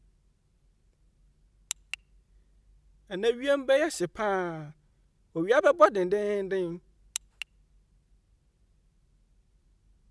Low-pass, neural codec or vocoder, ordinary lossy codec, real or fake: none; none; none; real